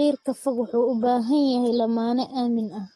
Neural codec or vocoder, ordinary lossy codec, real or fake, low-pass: codec, 44.1 kHz, 7.8 kbps, Pupu-Codec; AAC, 32 kbps; fake; 19.8 kHz